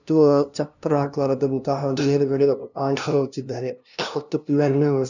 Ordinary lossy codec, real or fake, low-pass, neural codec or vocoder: none; fake; 7.2 kHz; codec, 16 kHz, 0.5 kbps, FunCodec, trained on LibriTTS, 25 frames a second